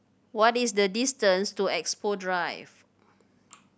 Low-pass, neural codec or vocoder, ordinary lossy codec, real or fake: none; none; none; real